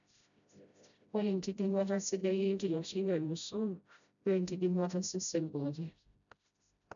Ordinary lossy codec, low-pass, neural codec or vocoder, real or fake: none; 7.2 kHz; codec, 16 kHz, 0.5 kbps, FreqCodec, smaller model; fake